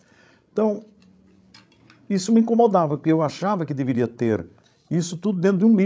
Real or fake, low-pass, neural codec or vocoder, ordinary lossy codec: fake; none; codec, 16 kHz, 16 kbps, FreqCodec, larger model; none